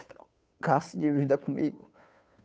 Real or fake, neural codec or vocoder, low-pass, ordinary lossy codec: fake; codec, 16 kHz, 2 kbps, FunCodec, trained on Chinese and English, 25 frames a second; none; none